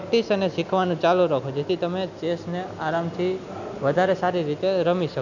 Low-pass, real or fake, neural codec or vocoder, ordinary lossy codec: 7.2 kHz; real; none; none